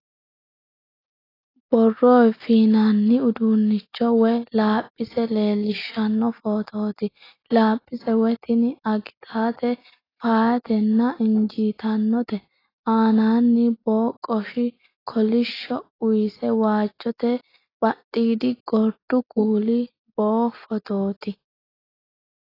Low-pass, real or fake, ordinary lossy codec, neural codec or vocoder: 5.4 kHz; real; AAC, 24 kbps; none